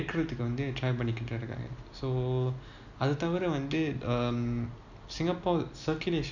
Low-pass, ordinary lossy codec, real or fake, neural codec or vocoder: 7.2 kHz; none; real; none